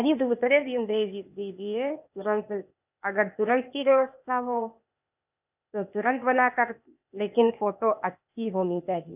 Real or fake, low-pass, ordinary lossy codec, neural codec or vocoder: fake; 3.6 kHz; none; codec, 16 kHz, 0.8 kbps, ZipCodec